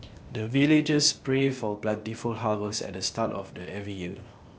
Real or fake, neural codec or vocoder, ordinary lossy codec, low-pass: fake; codec, 16 kHz, 0.8 kbps, ZipCodec; none; none